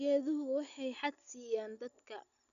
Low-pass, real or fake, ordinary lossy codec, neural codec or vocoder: 7.2 kHz; real; none; none